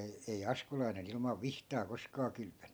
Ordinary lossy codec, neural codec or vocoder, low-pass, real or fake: none; none; none; real